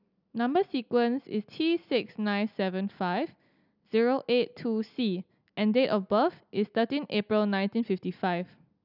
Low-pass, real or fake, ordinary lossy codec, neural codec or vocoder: 5.4 kHz; real; none; none